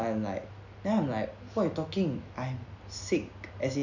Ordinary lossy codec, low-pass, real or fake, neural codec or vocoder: none; 7.2 kHz; real; none